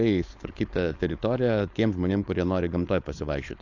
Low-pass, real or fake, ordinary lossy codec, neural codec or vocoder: 7.2 kHz; fake; AAC, 48 kbps; codec, 16 kHz, 4.8 kbps, FACodec